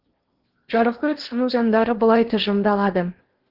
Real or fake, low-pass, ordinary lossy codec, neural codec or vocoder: fake; 5.4 kHz; Opus, 16 kbps; codec, 16 kHz in and 24 kHz out, 0.8 kbps, FocalCodec, streaming, 65536 codes